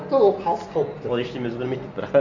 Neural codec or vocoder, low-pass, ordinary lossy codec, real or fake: none; 7.2 kHz; none; real